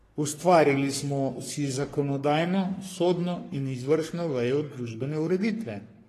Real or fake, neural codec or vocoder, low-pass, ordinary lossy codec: fake; codec, 44.1 kHz, 3.4 kbps, Pupu-Codec; 14.4 kHz; AAC, 48 kbps